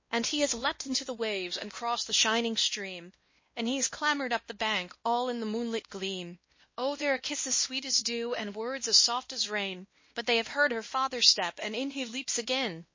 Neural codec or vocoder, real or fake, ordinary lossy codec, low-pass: codec, 16 kHz, 1 kbps, X-Codec, WavLM features, trained on Multilingual LibriSpeech; fake; MP3, 32 kbps; 7.2 kHz